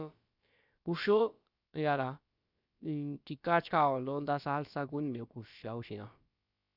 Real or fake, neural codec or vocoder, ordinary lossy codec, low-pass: fake; codec, 16 kHz, about 1 kbps, DyCAST, with the encoder's durations; Opus, 64 kbps; 5.4 kHz